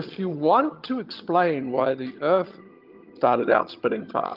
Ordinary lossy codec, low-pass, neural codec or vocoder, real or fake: Opus, 32 kbps; 5.4 kHz; vocoder, 22.05 kHz, 80 mel bands, HiFi-GAN; fake